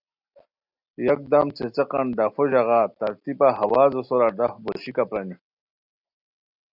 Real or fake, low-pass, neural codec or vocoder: real; 5.4 kHz; none